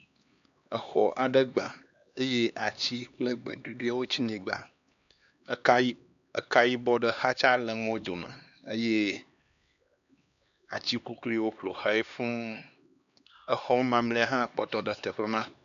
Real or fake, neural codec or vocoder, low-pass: fake; codec, 16 kHz, 2 kbps, X-Codec, HuBERT features, trained on LibriSpeech; 7.2 kHz